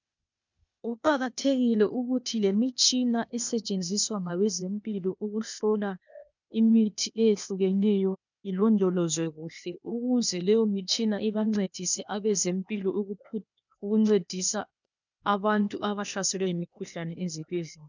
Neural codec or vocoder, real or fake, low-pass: codec, 16 kHz, 0.8 kbps, ZipCodec; fake; 7.2 kHz